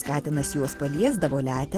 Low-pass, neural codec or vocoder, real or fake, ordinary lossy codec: 14.4 kHz; vocoder, 44.1 kHz, 128 mel bands every 512 samples, BigVGAN v2; fake; Opus, 16 kbps